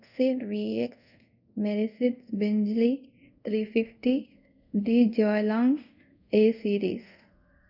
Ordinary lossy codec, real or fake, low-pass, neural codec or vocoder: none; fake; 5.4 kHz; codec, 24 kHz, 0.5 kbps, DualCodec